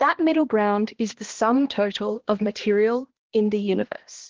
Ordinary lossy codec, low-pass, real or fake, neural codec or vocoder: Opus, 16 kbps; 7.2 kHz; fake; codec, 16 kHz, 1 kbps, X-Codec, HuBERT features, trained on balanced general audio